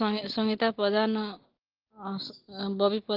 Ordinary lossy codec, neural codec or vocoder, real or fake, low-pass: Opus, 16 kbps; none; real; 5.4 kHz